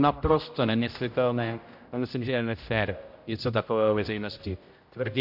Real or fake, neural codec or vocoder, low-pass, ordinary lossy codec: fake; codec, 16 kHz, 0.5 kbps, X-Codec, HuBERT features, trained on general audio; 5.4 kHz; MP3, 48 kbps